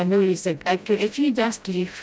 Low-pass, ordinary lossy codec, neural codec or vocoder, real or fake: none; none; codec, 16 kHz, 0.5 kbps, FreqCodec, smaller model; fake